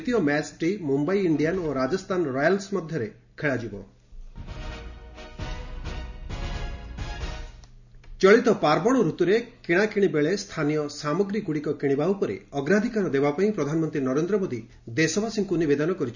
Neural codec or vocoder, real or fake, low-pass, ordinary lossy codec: none; real; 7.2 kHz; none